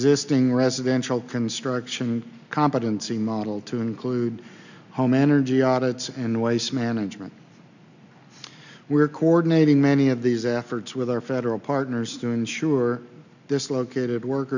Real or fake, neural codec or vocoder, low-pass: real; none; 7.2 kHz